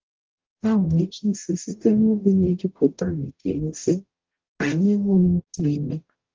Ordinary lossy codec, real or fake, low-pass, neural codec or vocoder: Opus, 24 kbps; fake; 7.2 kHz; codec, 44.1 kHz, 0.9 kbps, DAC